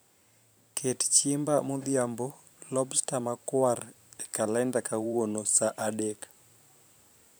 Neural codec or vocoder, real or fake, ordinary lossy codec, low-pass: vocoder, 44.1 kHz, 128 mel bands every 512 samples, BigVGAN v2; fake; none; none